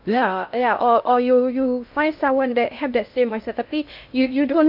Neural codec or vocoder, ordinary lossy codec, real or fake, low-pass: codec, 16 kHz in and 24 kHz out, 0.6 kbps, FocalCodec, streaming, 2048 codes; AAC, 48 kbps; fake; 5.4 kHz